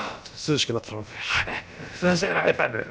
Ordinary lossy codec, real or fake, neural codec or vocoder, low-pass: none; fake; codec, 16 kHz, about 1 kbps, DyCAST, with the encoder's durations; none